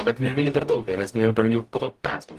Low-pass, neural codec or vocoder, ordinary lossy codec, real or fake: 14.4 kHz; codec, 44.1 kHz, 0.9 kbps, DAC; Opus, 24 kbps; fake